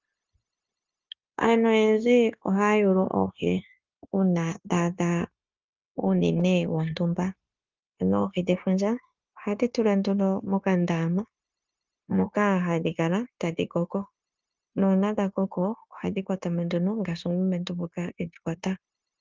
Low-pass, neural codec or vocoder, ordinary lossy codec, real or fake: 7.2 kHz; codec, 16 kHz, 0.9 kbps, LongCat-Audio-Codec; Opus, 24 kbps; fake